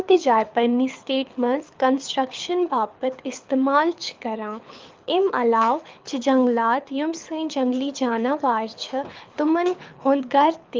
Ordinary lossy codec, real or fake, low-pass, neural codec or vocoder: Opus, 32 kbps; fake; 7.2 kHz; codec, 24 kHz, 6 kbps, HILCodec